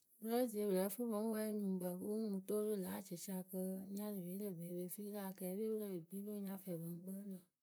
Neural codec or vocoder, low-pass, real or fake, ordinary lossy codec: vocoder, 44.1 kHz, 128 mel bands, Pupu-Vocoder; none; fake; none